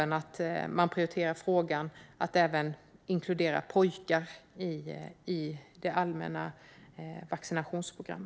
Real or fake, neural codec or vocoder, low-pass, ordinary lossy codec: real; none; none; none